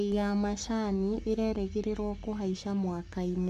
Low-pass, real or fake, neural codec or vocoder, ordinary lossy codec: 14.4 kHz; fake; codec, 44.1 kHz, 7.8 kbps, Pupu-Codec; AAC, 96 kbps